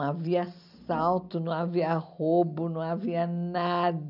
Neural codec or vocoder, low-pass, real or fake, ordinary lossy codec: none; 5.4 kHz; real; none